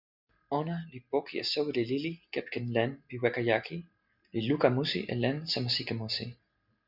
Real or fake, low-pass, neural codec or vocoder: real; 5.4 kHz; none